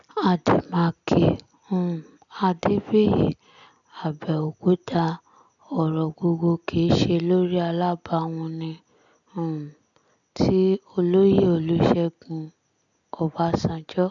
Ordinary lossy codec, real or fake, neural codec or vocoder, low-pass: none; real; none; 7.2 kHz